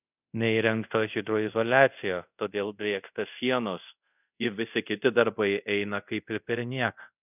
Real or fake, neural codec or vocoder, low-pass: fake; codec, 24 kHz, 0.5 kbps, DualCodec; 3.6 kHz